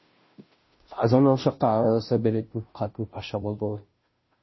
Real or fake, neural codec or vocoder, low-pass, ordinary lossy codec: fake; codec, 16 kHz, 0.5 kbps, FunCodec, trained on Chinese and English, 25 frames a second; 7.2 kHz; MP3, 24 kbps